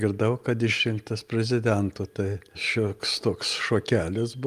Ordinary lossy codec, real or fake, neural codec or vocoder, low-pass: Opus, 32 kbps; real; none; 14.4 kHz